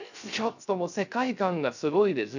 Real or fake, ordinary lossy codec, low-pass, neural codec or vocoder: fake; none; 7.2 kHz; codec, 16 kHz, 0.3 kbps, FocalCodec